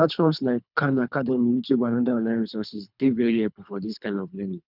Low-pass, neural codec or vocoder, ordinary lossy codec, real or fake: 5.4 kHz; codec, 24 kHz, 3 kbps, HILCodec; none; fake